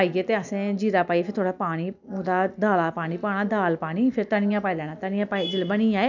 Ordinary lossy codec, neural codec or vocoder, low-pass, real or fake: none; none; 7.2 kHz; real